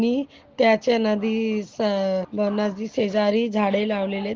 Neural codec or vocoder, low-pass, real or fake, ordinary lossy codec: none; 7.2 kHz; real; Opus, 16 kbps